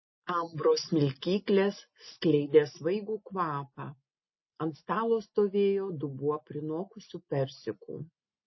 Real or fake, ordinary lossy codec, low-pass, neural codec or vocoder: real; MP3, 24 kbps; 7.2 kHz; none